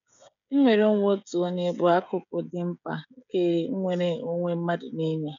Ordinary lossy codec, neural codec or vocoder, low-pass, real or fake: none; codec, 16 kHz, 16 kbps, FreqCodec, smaller model; 7.2 kHz; fake